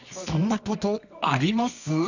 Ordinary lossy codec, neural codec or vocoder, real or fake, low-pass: none; codec, 24 kHz, 0.9 kbps, WavTokenizer, medium music audio release; fake; 7.2 kHz